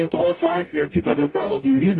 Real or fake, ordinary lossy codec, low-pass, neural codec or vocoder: fake; AAC, 32 kbps; 10.8 kHz; codec, 44.1 kHz, 0.9 kbps, DAC